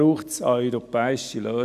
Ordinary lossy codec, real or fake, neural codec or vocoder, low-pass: none; real; none; 14.4 kHz